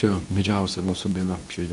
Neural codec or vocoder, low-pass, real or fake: codec, 24 kHz, 0.9 kbps, WavTokenizer, medium speech release version 2; 10.8 kHz; fake